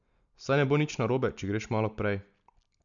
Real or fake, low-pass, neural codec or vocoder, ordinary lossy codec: real; 7.2 kHz; none; none